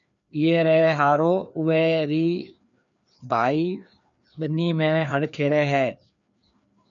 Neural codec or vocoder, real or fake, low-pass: codec, 16 kHz, 2 kbps, FreqCodec, larger model; fake; 7.2 kHz